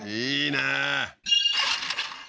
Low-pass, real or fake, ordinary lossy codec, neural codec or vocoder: none; real; none; none